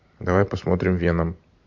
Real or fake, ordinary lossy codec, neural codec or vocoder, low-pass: fake; MP3, 48 kbps; vocoder, 44.1 kHz, 128 mel bands every 512 samples, BigVGAN v2; 7.2 kHz